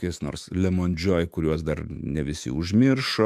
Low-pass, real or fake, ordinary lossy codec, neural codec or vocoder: 14.4 kHz; fake; MP3, 96 kbps; autoencoder, 48 kHz, 128 numbers a frame, DAC-VAE, trained on Japanese speech